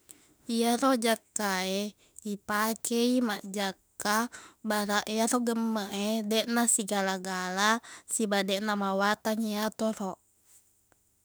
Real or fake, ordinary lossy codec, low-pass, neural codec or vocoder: fake; none; none; autoencoder, 48 kHz, 32 numbers a frame, DAC-VAE, trained on Japanese speech